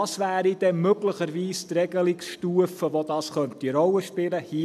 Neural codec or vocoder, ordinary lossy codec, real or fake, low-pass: none; none; real; 14.4 kHz